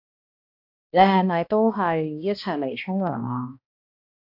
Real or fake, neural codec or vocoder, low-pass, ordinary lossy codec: fake; codec, 16 kHz, 1 kbps, X-Codec, HuBERT features, trained on balanced general audio; 5.4 kHz; AAC, 48 kbps